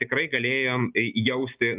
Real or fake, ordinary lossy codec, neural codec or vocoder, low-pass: real; Opus, 24 kbps; none; 3.6 kHz